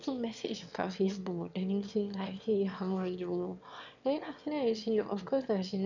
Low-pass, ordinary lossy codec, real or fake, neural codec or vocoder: 7.2 kHz; none; fake; autoencoder, 22.05 kHz, a latent of 192 numbers a frame, VITS, trained on one speaker